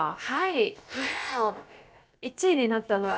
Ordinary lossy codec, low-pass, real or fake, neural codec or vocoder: none; none; fake; codec, 16 kHz, about 1 kbps, DyCAST, with the encoder's durations